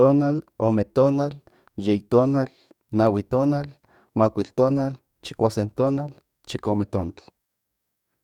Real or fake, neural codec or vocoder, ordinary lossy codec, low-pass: fake; codec, 44.1 kHz, 2.6 kbps, DAC; none; 19.8 kHz